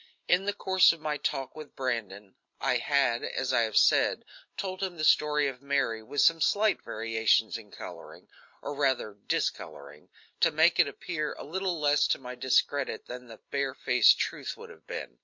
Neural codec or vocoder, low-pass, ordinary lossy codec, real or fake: none; 7.2 kHz; MP3, 48 kbps; real